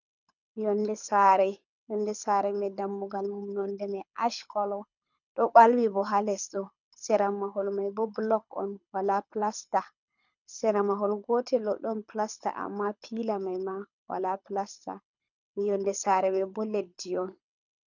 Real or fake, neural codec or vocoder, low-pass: fake; codec, 24 kHz, 6 kbps, HILCodec; 7.2 kHz